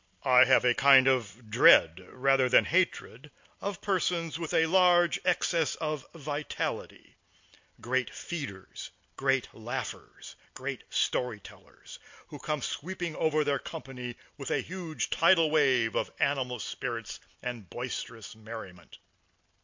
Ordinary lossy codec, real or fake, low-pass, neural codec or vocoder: MP3, 48 kbps; real; 7.2 kHz; none